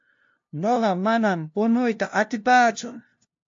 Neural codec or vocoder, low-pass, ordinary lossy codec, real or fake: codec, 16 kHz, 0.5 kbps, FunCodec, trained on LibriTTS, 25 frames a second; 7.2 kHz; AAC, 48 kbps; fake